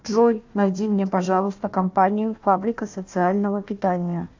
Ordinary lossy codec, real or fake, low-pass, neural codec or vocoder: AAC, 48 kbps; fake; 7.2 kHz; codec, 16 kHz, 1 kbps, FunCodec, trained on Chinese and English, 50 frames a second